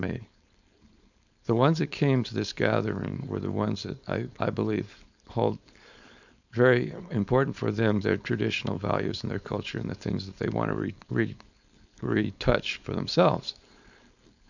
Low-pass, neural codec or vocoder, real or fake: 7.2 kHz; codec, 16 kHz, 4.8 kbps, FACodec; fake